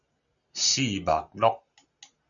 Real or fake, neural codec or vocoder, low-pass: real; none; 7.2 kHz